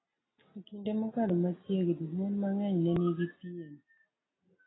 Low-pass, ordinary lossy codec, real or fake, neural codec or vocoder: 7.2 kHz; AAC, 16 kbps; real; none